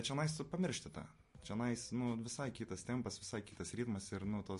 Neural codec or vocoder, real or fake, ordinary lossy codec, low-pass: none; real; MP3, 48 kbps; 14.4 kHz